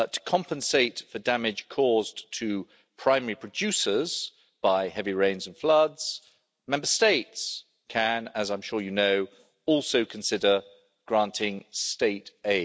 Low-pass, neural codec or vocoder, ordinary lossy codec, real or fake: none; none; none; real